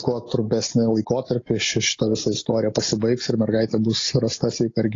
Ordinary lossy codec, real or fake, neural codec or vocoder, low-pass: AAC, 32 kbps; real; none; 7.2 kHz